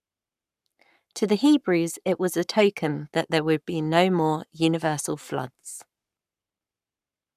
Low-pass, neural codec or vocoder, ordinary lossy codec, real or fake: 14.4 kHz; codec, 44.1 kHz, 7.8 kbps, Pupu-Codec; none; fake